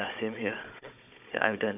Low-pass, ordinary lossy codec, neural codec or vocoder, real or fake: 3.6 kHz; none; codec, 16 kHz, 16 kbps, FunCodec, trained on Chinese and English, 50 frames a second; fake